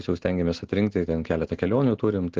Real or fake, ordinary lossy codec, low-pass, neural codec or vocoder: real; Opus, 16 kbps; 7.2 kHz; none